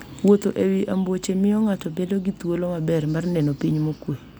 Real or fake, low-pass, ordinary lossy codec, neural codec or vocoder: real; none; none; none